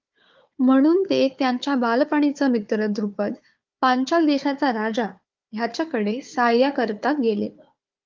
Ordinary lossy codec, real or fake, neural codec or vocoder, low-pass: Opus, 32 kbps; fake; codec, 16 kHz, 4 kbps, FunCodec, trained on Chinese and English, 50 frames a second; 7.2 kHz